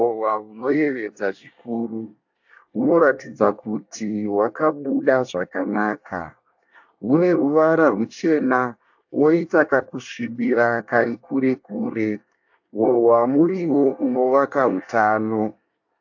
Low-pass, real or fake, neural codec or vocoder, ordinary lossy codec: 7.2 kHz; fake; codec, 24 kHz, 1 kbps, SNAC; AAC, 48 kbps